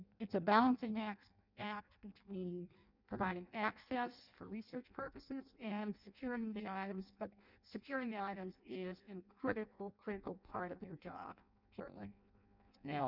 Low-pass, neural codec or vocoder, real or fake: 5.4 kHz; codec, 16 kHz in and 24 kHz out, 0.6 kbps, FireRedTTS-2 codec; fake